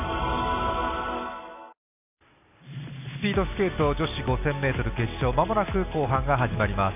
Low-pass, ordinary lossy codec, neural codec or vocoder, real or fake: 3.6 kHz; none; none; real